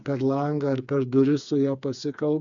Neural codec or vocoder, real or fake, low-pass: codec, 16 kHz, 4 kbps, FreqCodec, smaller model; fake; 7.2 kHz